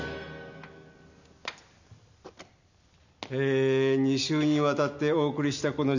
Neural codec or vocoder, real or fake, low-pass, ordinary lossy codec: none; real; 7.2 kHz; none